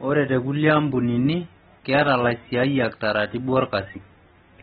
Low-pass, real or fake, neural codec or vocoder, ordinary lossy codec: 19.8 kHz; real; none; AAC, 16 kbps